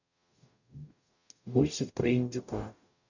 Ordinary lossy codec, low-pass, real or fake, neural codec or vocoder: none; 7.2 kHz; fake; codec, 44.1 kHz, 0.9 kbps, DAC